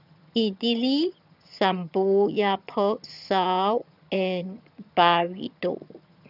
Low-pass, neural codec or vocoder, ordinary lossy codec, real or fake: 5.4 kHz; vocoder, 22.05 kHz, 80 mel bands, HiFi-GAN; none; fake